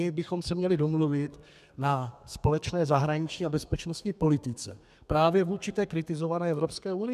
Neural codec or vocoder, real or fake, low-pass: codec, 32 kHz, 1.9 kbps, SNAC; fake; 14.4 kHz